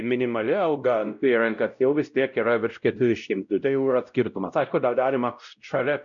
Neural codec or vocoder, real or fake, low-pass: codec, 16 kHz, 0.5 kbps, X-Codec, WavLM features, trained on Multilingual LibriSpeech; fake; 7.2 kHz